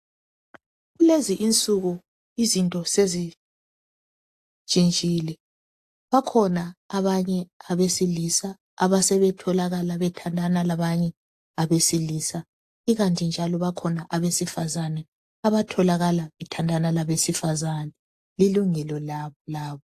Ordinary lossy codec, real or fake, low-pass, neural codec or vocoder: AAC, 64 kbps; real; 14.4 kHz; none